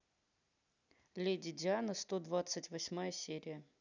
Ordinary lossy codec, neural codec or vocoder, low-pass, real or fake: none; none; 7.2 kHz; real